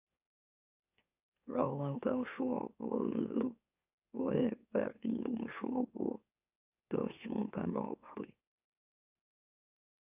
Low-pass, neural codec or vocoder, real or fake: 3.6 kHz; autoencoder, 44.1 kHz, a latent of 192 numbers a frame, MeloTTS; fake